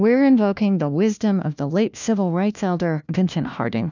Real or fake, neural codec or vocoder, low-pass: fake; codec, 16 kHz, 1 kbps, FunCodec, trained on LibriTTS, 50 frames a second; 7.2 kHz